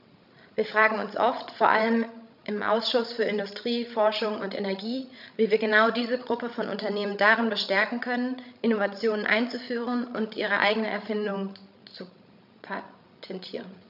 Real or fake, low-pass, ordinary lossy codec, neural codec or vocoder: fake; 5.4 kHz; none; codec, 16 kHz, 16 kbps, FreqCodec, larger model